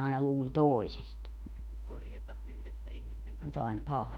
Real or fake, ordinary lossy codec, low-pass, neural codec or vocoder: fake; none; 19.8 kHz; autoencoder, 48 kHz, 32 numbers a frame, DAC-VAE, trained on Japanese speech